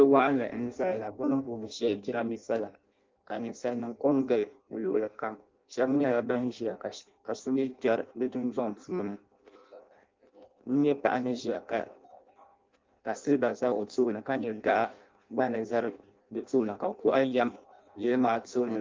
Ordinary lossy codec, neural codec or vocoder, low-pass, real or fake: Opus, 24 kbps; codec, 16 kHz in and 24 kHz out, 0.6 kbps, FireRedTTS-2 codec; 7.2 kHz; fake